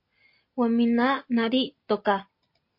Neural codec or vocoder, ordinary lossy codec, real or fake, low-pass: none; MP3, 32 kbps; real; 5.4 kHz